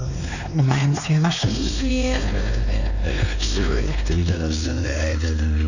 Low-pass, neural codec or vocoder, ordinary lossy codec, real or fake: 7.2 kHz; codec, 16 kHz, 2 kbps, X-Codec, WavLM features, trained on Multilingual LibriSpeech; none; fake